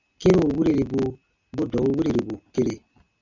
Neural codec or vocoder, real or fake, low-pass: none; real; 7.2 kHz